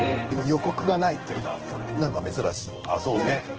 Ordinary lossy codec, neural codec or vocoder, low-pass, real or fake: Opus, 16 kbps; codec, 16 kHz in and 24 kHz out, 1 kbps, XY-Tokenizer; 7.2 kHz; fake